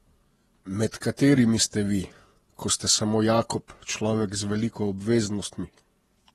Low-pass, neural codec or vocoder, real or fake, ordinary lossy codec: 19.8 kHz; vocoder, 48 kHz, 128 mel bands, Vocos; fake; AAC, 32 kbps